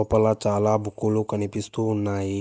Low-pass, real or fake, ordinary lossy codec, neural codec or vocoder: none; real; none; none